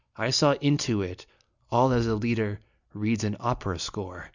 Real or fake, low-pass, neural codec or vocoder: real; 7.2 kHz; none